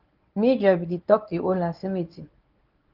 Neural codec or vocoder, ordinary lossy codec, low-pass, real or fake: codec, 24 kHz, 0.9 kbps, WavTokenizer, medium speech release version 1; Opus, 24 kbps; 5.4 kHz; fake